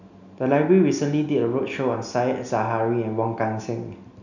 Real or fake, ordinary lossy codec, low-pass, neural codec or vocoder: real; none; 7.2 kHz; none